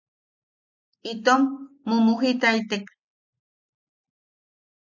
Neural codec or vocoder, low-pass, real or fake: none; 7.2 kHz; real